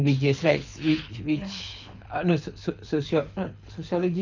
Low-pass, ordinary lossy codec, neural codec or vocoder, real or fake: 7.2 kHz; none; codec, 24 kHz, 6 kbps, HILCodec; fake